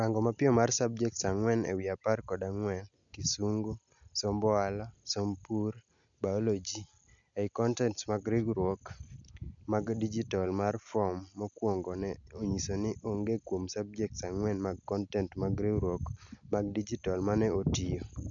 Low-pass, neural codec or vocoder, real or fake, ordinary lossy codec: 7.2 kHz; none; real; none